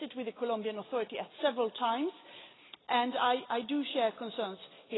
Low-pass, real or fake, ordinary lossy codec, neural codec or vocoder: 7.2 kHz; real; AAC, 16 kbps; none